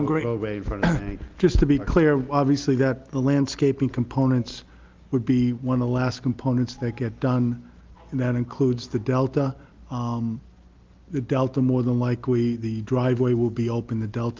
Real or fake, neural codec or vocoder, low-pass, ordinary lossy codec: real; none; 7.2 kHz; Opus, 32 kbps